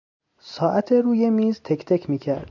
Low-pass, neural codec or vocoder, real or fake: 7.2 kHz; none; real